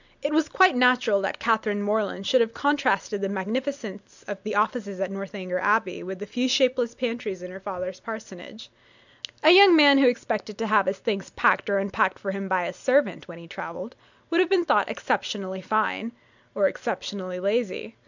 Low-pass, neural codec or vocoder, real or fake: 7.2 kHz; none; real